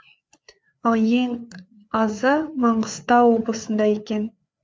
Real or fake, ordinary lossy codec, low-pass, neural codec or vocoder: fake; none; none; codec, 16 kHz, 4 kbps, FreqCodec, larger model